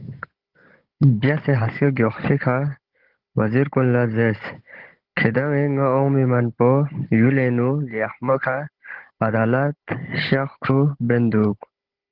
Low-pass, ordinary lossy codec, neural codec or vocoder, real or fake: 5.4 kHz; Opus, 16 kbps; codec, 16 kHz, 16 kbps, FunCodec, trained on Chinese and English, 50 frames a second; fake